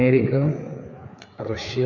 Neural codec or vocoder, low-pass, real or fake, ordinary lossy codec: codec, 16 kHz, 4 kbps, FreqCodec, larger model; 7.2 kHz; fake; none